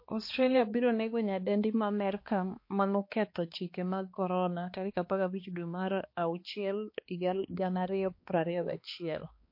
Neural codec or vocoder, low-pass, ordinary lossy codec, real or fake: codec, 16 kHz, 2 kbps, X-Codec, HuBERT features, trained on balanced general audio; 5.4 kHz; MP3, 32 kbps; fake